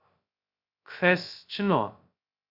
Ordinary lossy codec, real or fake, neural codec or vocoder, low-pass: Opus, 64 kbps; fake; codec, 16 kHz, 0.2 kbps, FocalCodec; 5.4 kHz